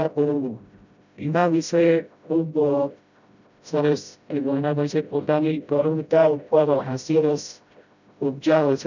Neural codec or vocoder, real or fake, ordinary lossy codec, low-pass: codec, 16 kHz, 0.5 kbps, FreqCodec, smaller model; fake; none; 7.2 kHz